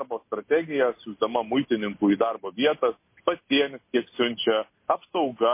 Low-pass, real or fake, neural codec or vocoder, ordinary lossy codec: 3.6 kHz; real; none; MP3, 24 kbps